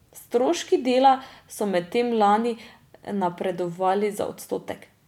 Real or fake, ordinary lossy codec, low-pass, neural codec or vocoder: real; none; 19.8 kHz; none